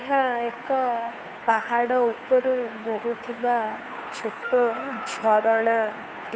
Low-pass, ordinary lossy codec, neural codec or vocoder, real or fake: none; none; codec, 16 kHz, 2 kbps, FunCodec, trained on Chinese and English, 25 frames a second; fake